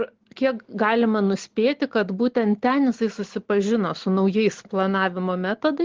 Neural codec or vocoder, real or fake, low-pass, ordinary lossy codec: none; real; 7.2 kHz; Opus, 16 kbps